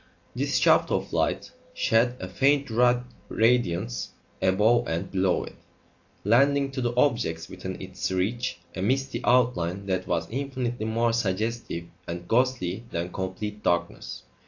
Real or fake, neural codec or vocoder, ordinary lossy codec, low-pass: real; none; AAC, 48 kbps; 7.2 kHz